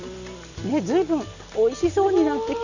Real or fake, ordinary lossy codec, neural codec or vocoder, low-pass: fake; none; vocoder, 44.1 kHz, 128 mel bands every 256 samples, BigVGAN v2; 7.2 kHz